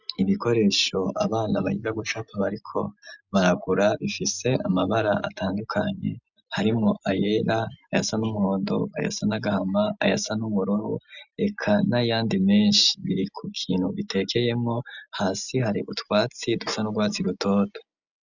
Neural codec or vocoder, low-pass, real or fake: none; 7.2 kHz; real